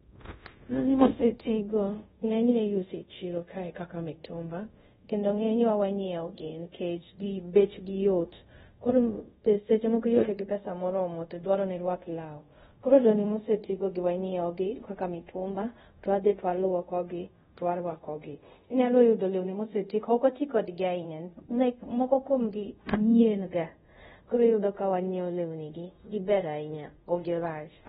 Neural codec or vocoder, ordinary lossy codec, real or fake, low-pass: codec, 24 kHz, 0.5 kbps, DualCodec; AAC, 16 kbps; fake; 10.8 kHz